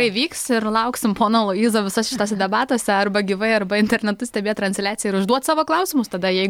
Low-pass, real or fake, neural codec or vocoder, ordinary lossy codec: 19.8 kHz; real; none; MP3, 96 kbps